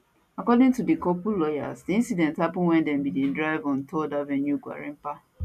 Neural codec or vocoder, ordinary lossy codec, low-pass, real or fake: none; none; 14.4 kHz; real